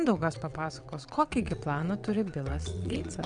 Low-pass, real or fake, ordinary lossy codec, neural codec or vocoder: 9.9 kHz; fake; MP3, 96 kbps; vocoder, 22.05 kHz, 80 mel bands, WaveNeXt